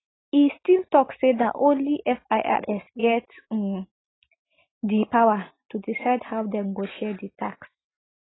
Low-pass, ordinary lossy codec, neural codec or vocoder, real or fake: 7.2 kHz; AAC, 16 kbps; none; real